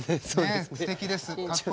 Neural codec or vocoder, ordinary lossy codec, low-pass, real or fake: none; none; none; real